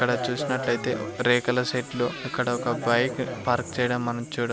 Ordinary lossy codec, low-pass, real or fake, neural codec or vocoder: none; none; real; none